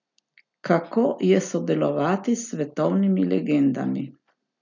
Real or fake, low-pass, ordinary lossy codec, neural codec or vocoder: real; 7.2 kHz; none; none